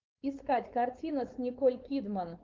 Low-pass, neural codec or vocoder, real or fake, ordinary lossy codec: 7.2 kHz; codec, 16 kHz, 4.8 kbps, FACodec; fake; Opus, 24 kbps